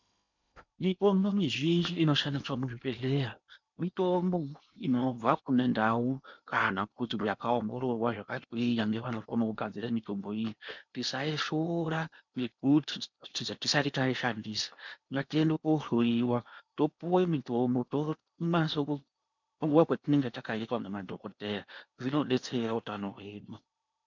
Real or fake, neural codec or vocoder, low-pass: fake; codec, 16 kHz in and 24 kHz out, 0.8 kbps, FocalCodec, streaming, 65536 codes; 7.2 kHz